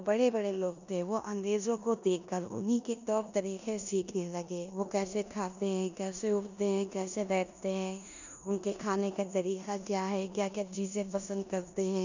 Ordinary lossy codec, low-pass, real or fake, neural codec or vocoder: none; 7.2 kHz; fake; codec, 16 kHz in and 24 kHz out, 0.9 kbps, LongCat-Audio-Codec, four codebook decoder